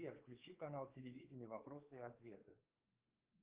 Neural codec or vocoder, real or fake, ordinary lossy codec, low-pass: codec, 16 kHz, 4 kbps, X-Codec, WavLM features, trained on Multilingual LibriSpeech; fake; Opus, 32 kbps; 3.6 kHz